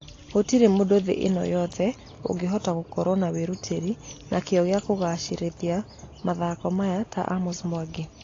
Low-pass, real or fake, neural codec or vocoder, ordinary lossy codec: 7.2 kHz; real; none; AAC, 32 kbps